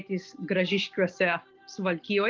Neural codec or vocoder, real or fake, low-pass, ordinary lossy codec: none; real; 7.2 kHz; Opus, 24 kbps